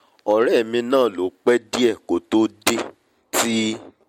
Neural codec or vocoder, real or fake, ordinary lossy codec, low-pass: none; real; MP3, 64 kbps; 19.8 kHz